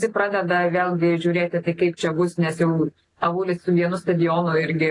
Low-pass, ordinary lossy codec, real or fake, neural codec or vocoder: 10.8 kHz; AAC, 32 kbps; real; none